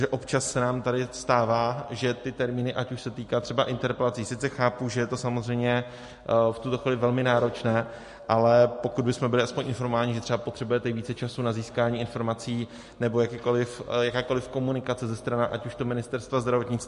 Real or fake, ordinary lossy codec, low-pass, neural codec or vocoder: real; MP3, 48 kbps; 14.4 kHz; none